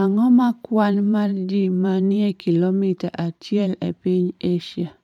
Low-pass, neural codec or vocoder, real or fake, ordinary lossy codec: 19.8 kHz; vocoder, 44.1 kHz, 128 mel bands every 512 samples, BigVGAN v2; fake; none